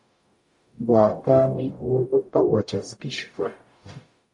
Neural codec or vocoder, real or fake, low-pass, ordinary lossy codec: codec, 44.1 kHz, 0.9 kbps, DAC; fake; 10.8 kHz; AAC, 64 kbps